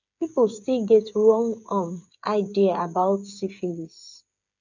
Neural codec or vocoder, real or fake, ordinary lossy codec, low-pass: codec, 16 kHz, 8 kbps, FreqCodec, smaller model; fake; none; 7.2 kHz